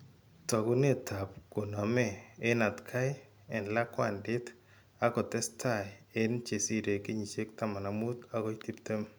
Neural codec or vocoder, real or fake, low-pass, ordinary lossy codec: none; real; none; none